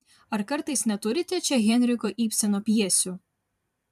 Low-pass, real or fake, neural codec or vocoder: 14.4 kHz; fake; vocoder, 44.1 kHz, 128 mel bands every 512 samples, BigVGAN v2